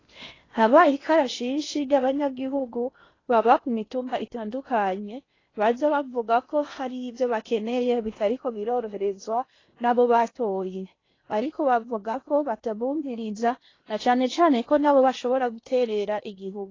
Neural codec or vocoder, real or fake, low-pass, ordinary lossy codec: codec, 16 kHz in and 24 kHz out, 0.8 kbps, FocalCodec, streaming, 65536 codes; fake; 7.2 kHz; AAC, 32 kbps